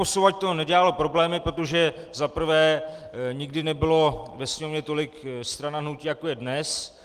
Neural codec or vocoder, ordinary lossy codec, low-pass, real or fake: none; Opus, 24 kbps; 14.4 kHz; real